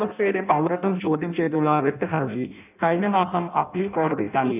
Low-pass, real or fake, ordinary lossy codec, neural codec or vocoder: 3.6 kHz; fake; none; codec, 16 kHz in and 24 kHz out, 0.6 kbps, FireRedTTS-2 codec